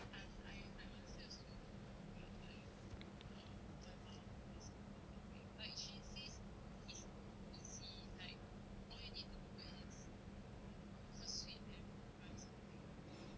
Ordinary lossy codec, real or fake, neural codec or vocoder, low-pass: none; real; none; none